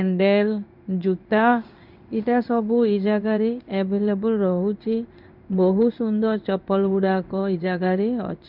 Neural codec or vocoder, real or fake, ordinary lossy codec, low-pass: codec, 16 kHz in and 24 kHz out, 1 kbps, XY-Tokenizer; fake; none; 5.4 kHz